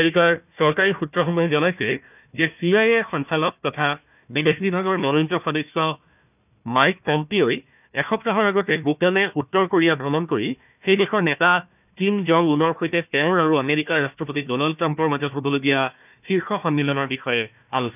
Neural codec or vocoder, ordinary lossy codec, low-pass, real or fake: codec, 16 kHz, 1 kbps, FunCodec, trained on Chinese and English, 50 frames a second; none; 3.6 kHz; fake